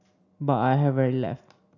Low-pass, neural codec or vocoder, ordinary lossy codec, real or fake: 7.2 kHz; none; none; real